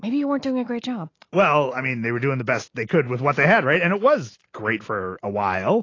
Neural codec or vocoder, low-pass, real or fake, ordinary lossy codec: none; 7.2 kHz; real; AAC, 32 kbps